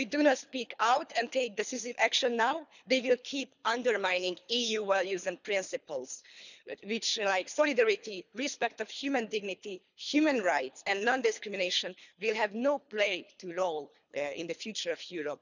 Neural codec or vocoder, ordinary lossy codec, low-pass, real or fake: codec, 24 kHz, 3 kbps, HILCodec; none; 7.2 kHz; fake